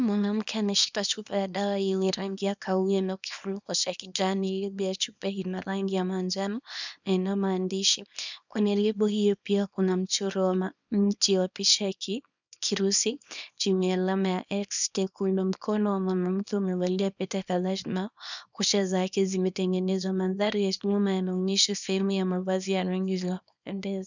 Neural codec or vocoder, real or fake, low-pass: codec, 24 kHz, 0.9 kbps, WavTokenizer, small release; fake; 7.2 kHz